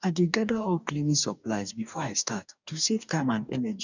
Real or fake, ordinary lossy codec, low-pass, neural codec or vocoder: fake; none; 7.2 kHz; codec, 44.1 kHz, 2.6 kbps, DAC